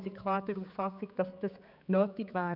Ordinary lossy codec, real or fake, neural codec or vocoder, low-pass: none; fake; codec, 16 kHz, 4 kbps, X-Codec, HuBERT features, trained on general audio; 5.4 kHz